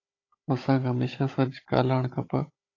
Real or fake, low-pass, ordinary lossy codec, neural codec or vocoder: fake; 7.2 kHz; AAC, 32 kbps; codec, 16 kHz, 16 kbps, FunCodec, trained on Chinese and English, 50 frames a second